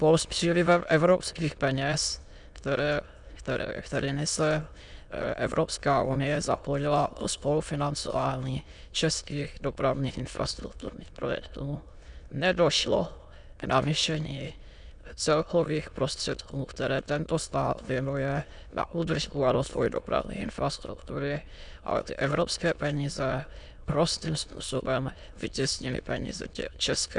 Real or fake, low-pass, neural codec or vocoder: fake; 9.9 kHz; autoencoder, 22.05 kHz, a latent of 192 numbers a frame, VITS, trained on many speakers